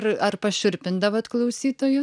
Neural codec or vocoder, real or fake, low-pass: none; real; 9.9 kHz